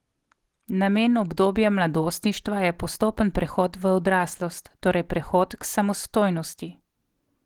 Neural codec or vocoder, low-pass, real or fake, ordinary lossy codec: none; 19.8 kHz; real; Opus, 16 kbps